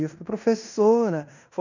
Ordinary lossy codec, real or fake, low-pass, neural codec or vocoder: none; fake; 7.2 kHz; codec, 16 kHz in and 24 kHz out, 0.9 kbps, LongCat-Audio-Codec, fine tuned four codebook decoder